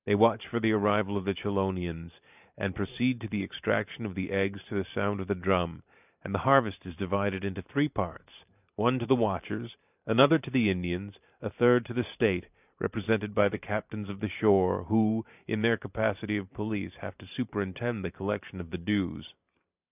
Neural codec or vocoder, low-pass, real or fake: vocoder, 44.1 kHz, 128 mel bands every 512 samples, BigVGAN v2; 3.6 kHz; fake